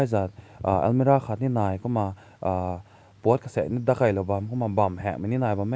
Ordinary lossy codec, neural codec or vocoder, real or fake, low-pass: none; none; real; none